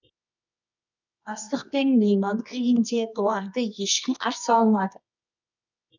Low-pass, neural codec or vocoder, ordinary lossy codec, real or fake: 7.2 kHz; codec, 24 kHz, 0.9 kbps, WavTokenizer, medium music audio release; none; fake